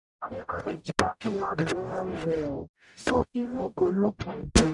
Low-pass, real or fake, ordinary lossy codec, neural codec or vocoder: 10.8 kHz; fake; none; codec, 44.1 kHz, 0.9 kbps, DAC